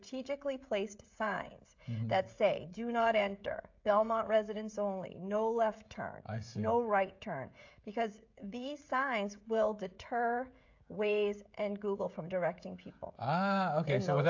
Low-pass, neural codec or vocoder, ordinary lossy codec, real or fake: 7.2 kHz; codec, 16 kHz, 16 kbps, FreqCodec, smaller model; AAC, 48 kbps; fake